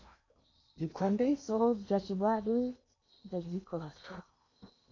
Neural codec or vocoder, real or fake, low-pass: codec, 16 kHz in and 24 kHz out, 0.8 kbps, FocalCodec, streaming, 65536 codes; fake; 7.2 kHz